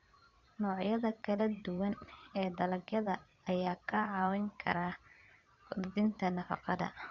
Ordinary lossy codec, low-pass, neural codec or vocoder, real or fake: Opus, 64 kbps; 7.2 kHz; vocoder, 22.05 kHz, 80 mel bands, Vocos; fake